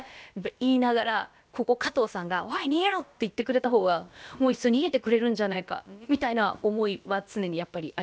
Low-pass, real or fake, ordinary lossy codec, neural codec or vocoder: none; fake; none; codec, 16 kHz, about 1 kbps, DyCAST, with the encoder's durations